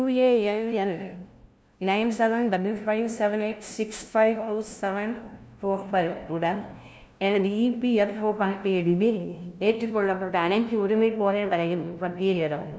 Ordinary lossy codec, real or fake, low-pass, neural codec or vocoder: none; fake; none; codec, 16 kHz, 0.5 kbps, FunCodec, trained on LibriTTS, 25 frames a second